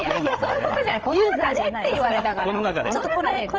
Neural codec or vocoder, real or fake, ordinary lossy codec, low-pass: codec, 16 kHz, 8 kbps, FreqCodec, larger model; fake; Opus, 24 kbps; 7.2 kHz